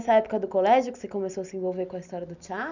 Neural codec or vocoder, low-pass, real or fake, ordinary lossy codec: none; 7.2 kHz; real; none